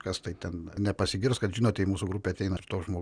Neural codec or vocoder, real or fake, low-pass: none; real; 9.9 kHz